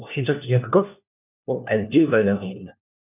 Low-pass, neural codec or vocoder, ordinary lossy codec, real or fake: 3.6 kHz; codec, 16 kHz, 1 kbps, FunCodec, trained on LibriTTS, 50 frames a second; none; fake